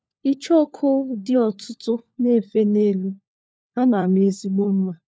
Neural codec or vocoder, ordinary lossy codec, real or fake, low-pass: codec, 16 kHz, 4 kbps, FunCodec, trained on LibriTTS, 50 frames a second; none; fake; none